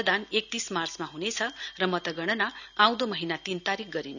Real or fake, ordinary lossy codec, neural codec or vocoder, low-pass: real; none; none; 7.2 kHz